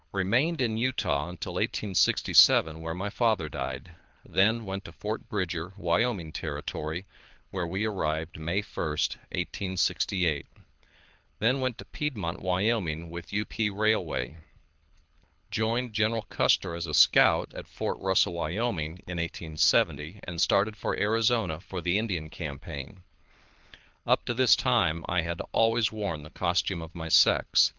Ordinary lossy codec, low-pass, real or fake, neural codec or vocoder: Opus, 24 kbps; 7.2 kHz; fake; codec, 24 kHz, 6 kbps, HILCodec